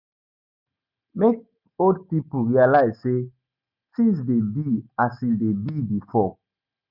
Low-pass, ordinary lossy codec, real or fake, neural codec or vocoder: 5.4 kHz; none; real; none